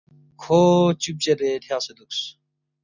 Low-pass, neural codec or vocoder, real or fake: 7.2 kHz; none; real